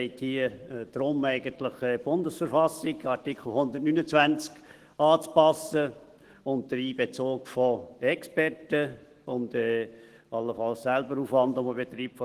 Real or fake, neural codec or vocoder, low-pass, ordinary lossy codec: fake; autoencoder, 48 kHz, 128 numbers a frame, DAC-VAE, trained on Japanese speech; 14.4 kHz; Opus, 16 kbps